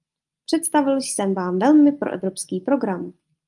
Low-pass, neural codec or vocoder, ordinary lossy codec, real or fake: 10.8 kHz; none; Opus, 32 kbps; real